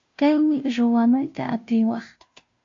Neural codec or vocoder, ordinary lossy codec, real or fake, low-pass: codec, 16 kHz, 0.5 kbps, FunCodec, trained on Chinese and English, 25 frames a second; MP3, 48 kbps; fake; 7.2 kHz